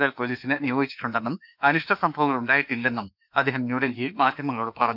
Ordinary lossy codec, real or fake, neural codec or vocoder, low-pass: none; fake; autoencoder, 48 kHz, 32 numbers a frame, DAC-VAE, trained on Japanese speech; 5.4 kHz